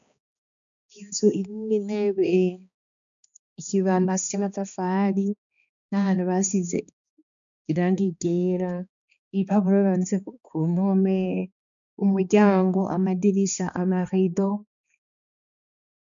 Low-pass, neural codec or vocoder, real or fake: 7.2 kHz; codec, 16 kHz, 2 kbps, X-Codec, HuBERT features, trained on balanced general audio; fake